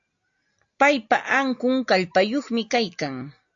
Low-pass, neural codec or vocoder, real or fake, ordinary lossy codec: 7.2 kHz; none; real; AAC, 64 kbps